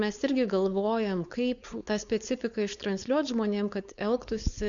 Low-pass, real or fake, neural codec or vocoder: 7.2 kHz; fake; codec, 16 kHz, 4.8 kbps, FACodec